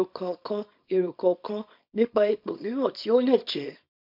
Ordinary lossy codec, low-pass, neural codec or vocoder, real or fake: MP3, 48 kbps; 5.4 kHz; codec, 24 kHz, 0.9 kbps, WavTokenizer, small release; fake